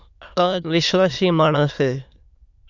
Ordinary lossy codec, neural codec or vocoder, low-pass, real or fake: Opus, 64 kbps; autoencoder, 22.05 kHz, a latent of 192 numbers a frame, VITS, trained on many speakers; 7.2 kHz; fake